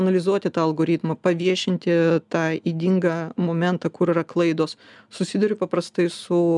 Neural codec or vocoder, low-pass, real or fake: none; 10.8 kHz; real